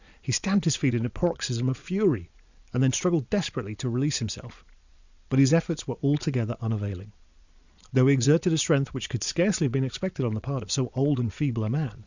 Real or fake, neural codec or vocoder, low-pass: fake; vocoder, 22.05 kHz, 80 mel bands, Vocos; 7.2 kHz